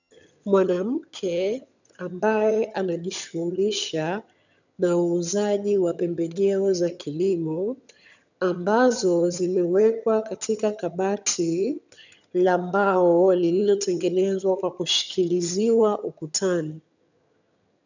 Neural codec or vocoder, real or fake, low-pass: vocoder, 22.05 kHz, 80 mel bands, HiFi-GAN; fake; 7.2 kHz